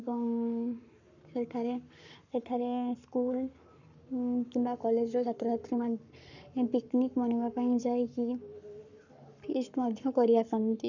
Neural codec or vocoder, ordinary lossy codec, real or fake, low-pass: codec, 44.1 kHz, 7.8 kbps, Pupu-Codec; none; fake; 7.2 kHz